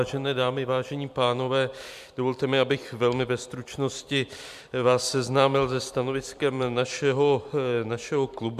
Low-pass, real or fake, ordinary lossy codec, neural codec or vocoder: 14.4 kHz; fake; MP3, 96 kbps; vocoder, 44.1 kHz, 128 mel bands every 256 samples, BigVGAN v2